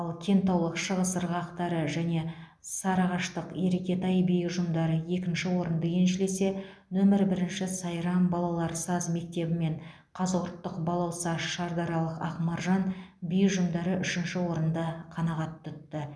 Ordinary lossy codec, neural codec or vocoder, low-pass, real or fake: none; none; none; real